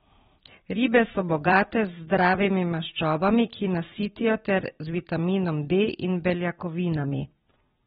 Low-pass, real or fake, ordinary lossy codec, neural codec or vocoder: 19.8 kHz; fake; AAC, 16 kbps; vocoder, 44.1 kHz, 128 mel bands every 256 samples, BigVGAN v2